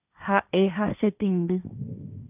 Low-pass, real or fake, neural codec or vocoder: 3.6 kHz; fake; codec, 16 kHz, 1.1 kbps, Voila-Tokenizer